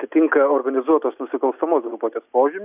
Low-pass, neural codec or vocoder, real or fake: 3.6 kHz; none; real